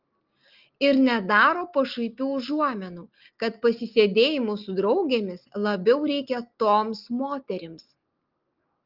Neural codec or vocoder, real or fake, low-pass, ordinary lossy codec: none; real; 5.4 kHz; Opus, 24 kbps